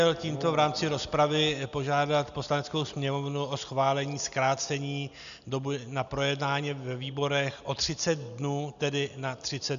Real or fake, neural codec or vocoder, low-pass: real; none; 7.2 kHz